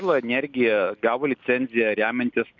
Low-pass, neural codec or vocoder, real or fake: 7.2 kHz; none; real